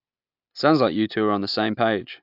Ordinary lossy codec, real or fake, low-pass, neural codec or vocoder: AAC, 48 kbps; real; 5.4 kHz; none